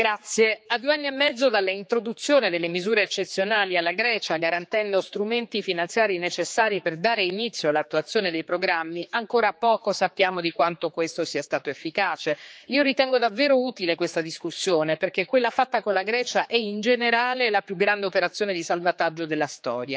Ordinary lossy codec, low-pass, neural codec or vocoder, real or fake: none; none; codec, 16 kHz, 4 kbps, X-Codec, HuBERT features, trained on general audio; fake